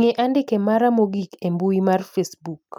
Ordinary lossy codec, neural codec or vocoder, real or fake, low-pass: MP3, 96 kbps; none; real; 19.8 kHz